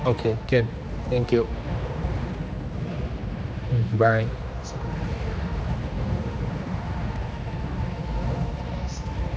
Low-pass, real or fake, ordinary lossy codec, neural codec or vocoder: none; fake; none; codec, 16 kHz, 2 kbps, X-Codec, HuBERT features, trained on general audio